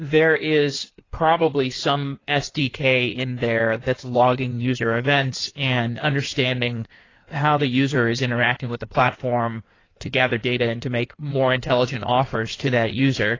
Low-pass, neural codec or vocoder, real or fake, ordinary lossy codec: 7.2 kHz; codec, 16 kHz in and 24 kHz out, 1.1 kbps, FireRedTTS-2 codec; fake; AAC, 32 kbps